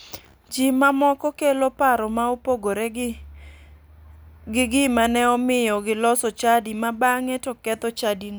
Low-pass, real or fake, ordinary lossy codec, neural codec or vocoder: none; real; none; none